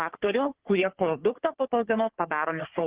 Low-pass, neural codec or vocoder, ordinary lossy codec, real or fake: 3.6 kHz; codec, 44.1 kHz, 3.4 kbps, Pupu-Codec; Opus, 16 kbps; fake